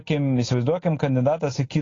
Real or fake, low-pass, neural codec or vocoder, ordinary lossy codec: real; 7.2 kHz; none; AAC, 32 kbps